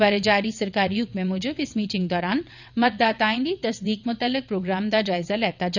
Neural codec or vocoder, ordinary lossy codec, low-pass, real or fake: vocoder, 22.05 kHz, 80 mel bands, WaveNeXt; none; 7.2 kHz; fake